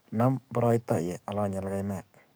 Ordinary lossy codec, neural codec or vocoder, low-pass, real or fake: none; codec, 44.1 kHz, 7.8 kbps, DAC; none; fake